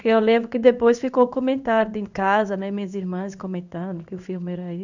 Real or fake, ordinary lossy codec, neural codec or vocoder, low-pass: fake; none; codec, 16 kHz in and 24 kHz out, 1 kbps, XY-Tokenizer; 7.2 kHz